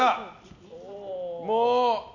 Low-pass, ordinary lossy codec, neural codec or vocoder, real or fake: 7.2 kHz; none; none; real